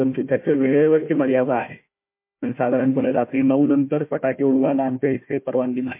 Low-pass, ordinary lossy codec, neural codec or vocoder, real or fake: 3.6 kHz; MP3, 24 kbps; codec, 16 kHz, 1 kbps, FunCodec, trained on Chinese and English, 50 frames a second; fake